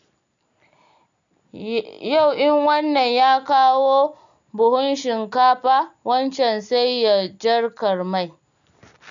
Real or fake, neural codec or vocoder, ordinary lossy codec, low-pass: real; none; none; 7.2 kHz